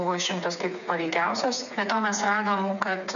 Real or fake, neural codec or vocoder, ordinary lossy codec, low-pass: fake; codec, 16 kHz, 4 kbps, FreqCodec, smaller model; MP3, 96 kbps; 7.2 kHz